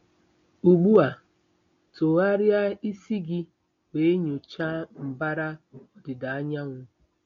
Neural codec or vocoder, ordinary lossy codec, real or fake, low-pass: none; AAC, 48 kbps; real; 7.2 kHz